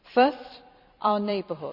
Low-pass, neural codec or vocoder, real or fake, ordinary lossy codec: 5.4 kHz; vocoder, 44.1 kHz, 128 mel bands every 256 samples, BigVGAN v2; fake; none